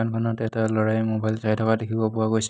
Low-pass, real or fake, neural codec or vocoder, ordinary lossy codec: none; real; none; none